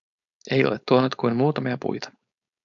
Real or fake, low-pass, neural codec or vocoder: fake; 7.2 kHz; codec, 16 kHz, 4.8 kbps, FACodec